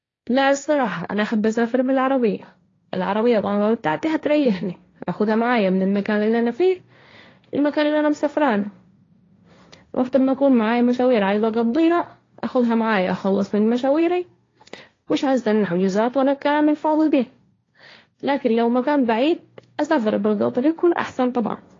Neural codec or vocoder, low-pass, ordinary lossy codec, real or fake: codec, 16 kHz, 1.1 kbps, Voila-Tokenizer; 7.2 kHz; AAC, 32 kbps; fake